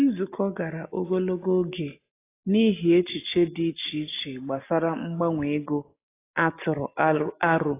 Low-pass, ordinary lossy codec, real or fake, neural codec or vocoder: 3.6 kHz; AAC, 24 kbps; real; none